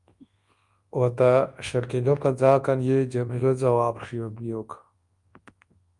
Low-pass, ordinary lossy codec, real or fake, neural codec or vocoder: 10.8 kHz; Opus, 32 kbps; fake; codec, 24 kHz, 0.9 kbps, WavTokenizer, large speech release